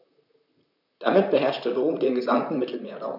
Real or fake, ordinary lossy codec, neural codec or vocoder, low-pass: fake; none; vocoder, 44.1 kHz, 128 mel bands, Pupu-Vocoder; 5.4 kHz